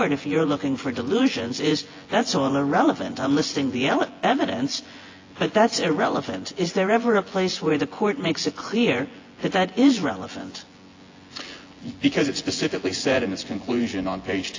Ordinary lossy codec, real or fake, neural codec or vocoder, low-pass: AAC, 32 kbps; fake; vocoder, 24 kHz, 100 mel bands, Vocos; 7.2 kHz